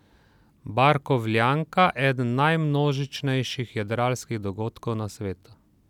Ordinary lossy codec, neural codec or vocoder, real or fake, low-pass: none; none; real; 19.8 kHz